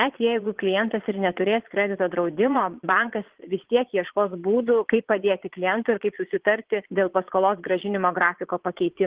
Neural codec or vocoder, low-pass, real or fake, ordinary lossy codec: vocoder, 22.05 kHz, 80 mel bands, Vocos; 3.6 kHz; fake; Opus, 16 kbps